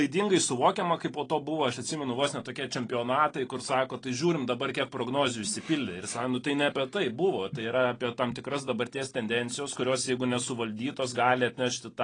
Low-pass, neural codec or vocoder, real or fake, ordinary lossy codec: 9.9 kHz; none; real; AAC, 32 kbps